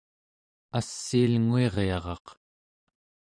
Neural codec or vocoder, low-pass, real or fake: vocoder, 44.1 kHz, 128 mel bands every 512 samples, BigVGAN v2; 9.9 kHz; fake